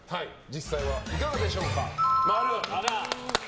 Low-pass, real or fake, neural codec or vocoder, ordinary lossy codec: none; real; none; none